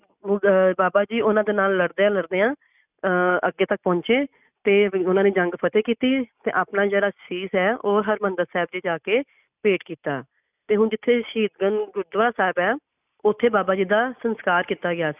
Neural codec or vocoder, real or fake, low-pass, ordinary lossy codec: none; real; 3.6 kHz; none